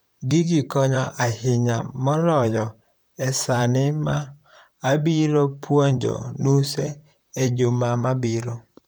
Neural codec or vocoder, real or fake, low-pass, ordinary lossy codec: vocoder, 44.1 kHz, 128 mel bands, Pupu-Vocoder; fake; none; none